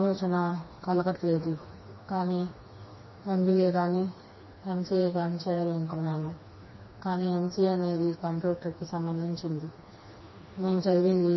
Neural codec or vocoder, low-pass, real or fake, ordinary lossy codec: codec, 16 kHz, 2 kbps, FreqCodec, smaller model; 7.2 kHz; fake; MP3, 24 kbps